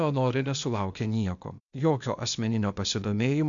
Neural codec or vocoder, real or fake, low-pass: codec, 16 kHz, 0.8 kbps, ZipCodec; fake; 7.2 kHz